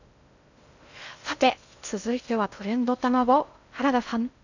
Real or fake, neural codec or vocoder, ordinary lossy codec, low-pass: fake; codec, 16 kHz in and 24 kHz out, 0.6 kbps, FocalCodec, streaming, 2048 codes; none; 7.2 kHz